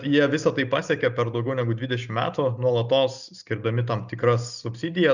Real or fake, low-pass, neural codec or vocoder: real; 7.2 kHz; none